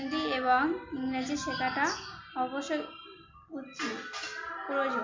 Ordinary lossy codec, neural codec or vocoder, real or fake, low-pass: MP3, 48 kbps; none; real; 7.2 kHz